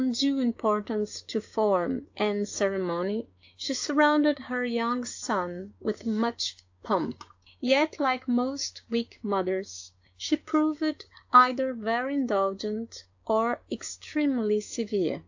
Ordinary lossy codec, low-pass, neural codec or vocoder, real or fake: AAC, 48 kbps; 7.2 kHz; codec, 16 kHz, 6 kbps, DAC; fake